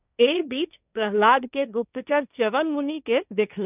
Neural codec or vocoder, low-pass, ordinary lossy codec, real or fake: codec, 16 kHz, 1.1 kbps, Voila-Tokenizer; 3.6 kHz; none; fake